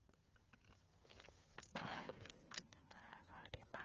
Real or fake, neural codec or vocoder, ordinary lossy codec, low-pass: fake; codec, 16 kHz, 8 kbps, FreqCodec, smaller model; Opus, 24 kbps; 7.2 kHz